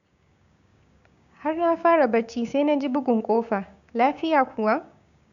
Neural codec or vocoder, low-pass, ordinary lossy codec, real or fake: codec, 16 kHz, 6 kbps, DAC; 7.2 kHz; MP3, 96 kbps; fake